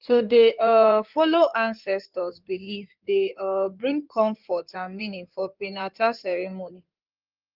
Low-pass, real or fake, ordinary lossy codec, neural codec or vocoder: 5.4 kHz; fake; Opus, 32 kbps; codec, 16 kHz in and 24 kHz out, 2.2 kbps, FireRedTTS-2 codec